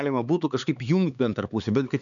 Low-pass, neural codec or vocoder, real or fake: 7.2 kHz; codec, 16 kHz, 4 kbps, X-Codec, HuBERT features, trained on LibriSpeech; fake